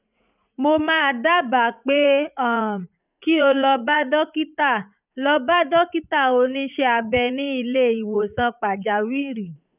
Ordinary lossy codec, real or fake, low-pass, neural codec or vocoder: none; fake; 3.6 kHz; vocoder, 44.1 kHz, 80 mel bands, Vocos